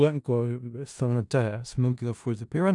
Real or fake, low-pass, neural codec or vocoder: fake; 10.8 kHz; codec, 16 kHz in and 24 kHz out, 0.4 kbps, LongCat-Audio-Codec, four codebook decoder